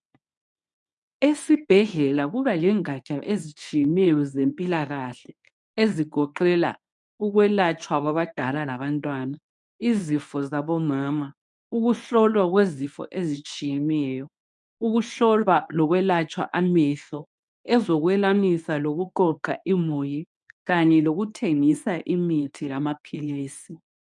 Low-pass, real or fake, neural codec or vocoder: 10.8 kHz; fake; codec, 24 kHz, 0.9 kbps, WavTokenizer, medium speech release version 1